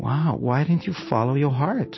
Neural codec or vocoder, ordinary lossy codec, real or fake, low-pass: none; MP3, 24 kbps; real; 7.2 kHz